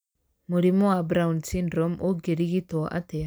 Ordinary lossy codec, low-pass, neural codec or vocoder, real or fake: none; none; none; real